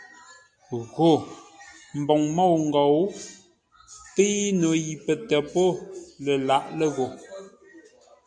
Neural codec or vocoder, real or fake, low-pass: none; real; 9.9 kHz